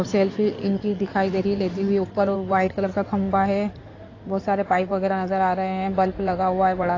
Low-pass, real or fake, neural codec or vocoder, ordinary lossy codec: 7.2 kHz; fake; codec, 16 kHz in and 24 kHz out, 2.2 kbps, FireRedTTS-2 codec; AAC, 32 kbps